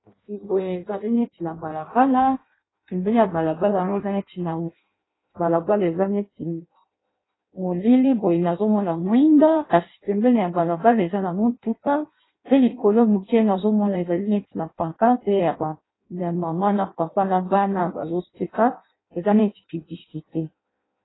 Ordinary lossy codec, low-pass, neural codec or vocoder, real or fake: AAC, 16 kbps; 7.2 kHz; codec, 16 kHz in and 24 kHz out, 0.6 kbps, FireRedTTS-2 codec; fake